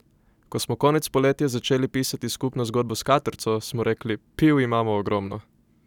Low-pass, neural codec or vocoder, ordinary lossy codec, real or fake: 19.8 kHz; none; none; real